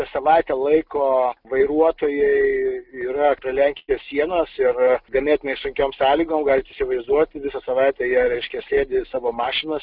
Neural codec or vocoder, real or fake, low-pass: none; real; 5.4 kHz